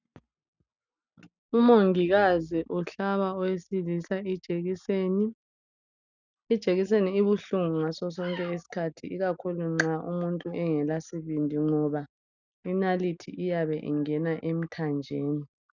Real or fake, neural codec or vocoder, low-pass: real; none; 7.2 kHz